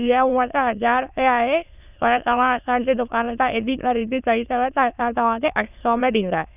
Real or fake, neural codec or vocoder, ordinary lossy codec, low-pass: fake; autoencoder, 22.05 kHz, a latent of 192 numbers a frame, VITS, trained on many speakers; none; 3.6 kHz